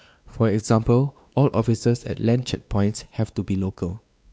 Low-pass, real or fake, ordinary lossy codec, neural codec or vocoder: none; fake; none; codec, 16 kHz, 2 kbps, X-Codec, WavLM features, trained on Multilingual LibriSpeech